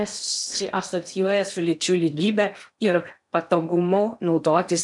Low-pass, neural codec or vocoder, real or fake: 10.8 kHz; codec, 16 kHz in and 24 kHz out, 0.8 kbps, FocalCodec, streaming, 65536 codes; fake